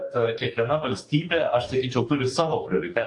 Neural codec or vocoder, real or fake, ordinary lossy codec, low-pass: codec, 44.1 kHz, 2.6 kbps, DAC; fake; AAC, 48 kbps; 10.8 kHz